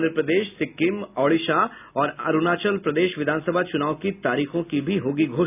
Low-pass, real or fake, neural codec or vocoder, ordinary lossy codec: 3.6 kHz; real; none; none